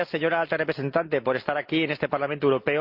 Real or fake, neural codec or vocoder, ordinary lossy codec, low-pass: real; none; Opus, 24 kbps; 5.4 kHz